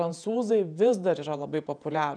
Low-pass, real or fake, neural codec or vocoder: 9.9 kHz; fake; vocoder, 48 kHz, 128 mel bands, Vocos